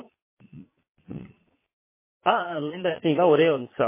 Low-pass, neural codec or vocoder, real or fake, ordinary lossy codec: 3.6 kHz; vocoder, 22.05 kHz, 80 mel bands, Vocos; fake; MP3, 16 kbps